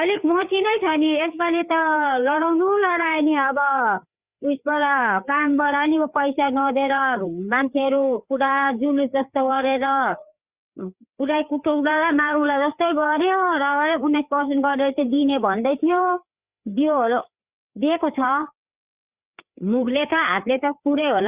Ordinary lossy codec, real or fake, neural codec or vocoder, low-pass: Opus, 64 kbps; fake; codec, 16 kHz, 4 kbps, FreqCodec, larger model; 3.6 kHz